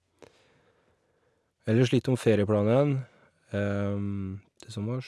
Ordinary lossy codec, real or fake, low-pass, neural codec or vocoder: none; real; none; none